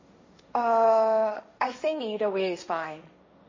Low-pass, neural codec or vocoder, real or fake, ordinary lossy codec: 7.2 kHz; codec, 16 kHz, 1.1 kbps, Voila-Tokenizer; fake; MP3, 32 kbps